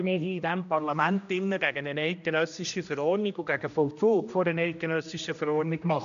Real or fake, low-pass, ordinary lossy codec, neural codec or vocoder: fake; 7.2 kHz; none; codec, 16 kHz, 1 kbps, X-Codec, HuBERT features, trained on general audio